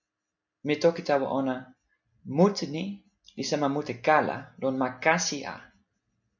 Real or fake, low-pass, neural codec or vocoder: real; 7.2 kHz; none